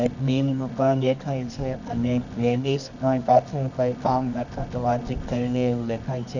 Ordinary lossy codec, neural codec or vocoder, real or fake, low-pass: none; codec, 24 kHz, 0.9 kbps, WavTokenizer, medium music audio release; fake; 7.2 kHz